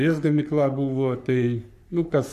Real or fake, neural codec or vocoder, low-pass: fake; codec, 44.1 kHz, 3.4 kbps, Pupu-Codec; 14.4 kHz